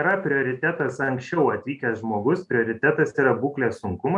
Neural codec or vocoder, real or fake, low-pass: none; real; 10.8 kHz